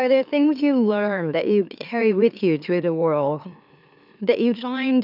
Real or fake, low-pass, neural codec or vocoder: fake; 5.4 kHz; autoencoder, 44.1 kHz, a latent of 192 numbers a frame, MeloTTS